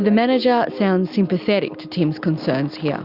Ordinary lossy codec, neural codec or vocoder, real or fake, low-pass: Opus, 64 kbps; none; real; 5.4 kHz